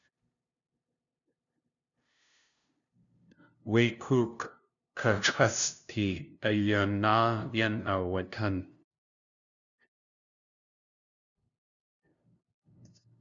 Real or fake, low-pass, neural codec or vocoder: fake; 7.2 kHz; codec, 16 kHz, 0.5 kbps, FunCodec, trained on LibriTTS, 25 frames a second